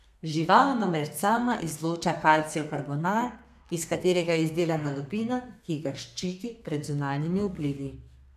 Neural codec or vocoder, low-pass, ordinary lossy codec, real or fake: codec, 32 kHz, 1.9 kbps, SNAC; 14.4 kHz; none; fake